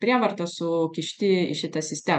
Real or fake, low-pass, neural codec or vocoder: real; 10.8 kHz; none